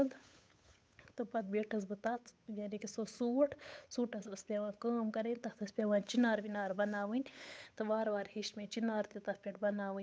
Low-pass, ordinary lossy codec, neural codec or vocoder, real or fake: none; none; codec, 16 kHz, 8 kbps, FunCodec, trained on Chinese and English, 25 frames a second; fake